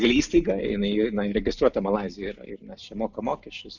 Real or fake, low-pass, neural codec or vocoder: real; 7.2 kHz; none